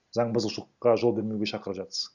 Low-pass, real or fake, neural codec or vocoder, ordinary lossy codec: 7.2 kHz; real; none; none